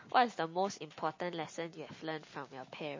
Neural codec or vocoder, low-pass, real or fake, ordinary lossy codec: none; 7.2 kHz; real; MP3, 32 kbps